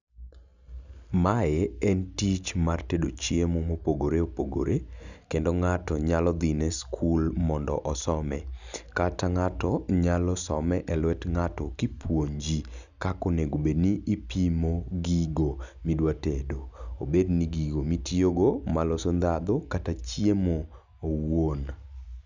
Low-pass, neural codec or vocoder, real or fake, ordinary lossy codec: 7.2 kHz; none; real; none